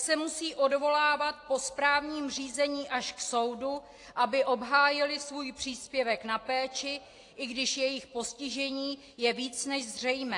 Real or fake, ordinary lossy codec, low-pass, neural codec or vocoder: real; AAC, 48 kbps; 10.8 kHz; none